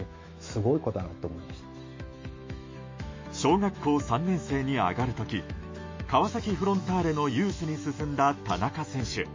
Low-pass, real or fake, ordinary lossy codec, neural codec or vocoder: 7.2 kHz; fake; MP3, 32 kbps; autoencoder, 48 kHz, 128 numbers a frame, DAC-VAE, trained on Japanese speech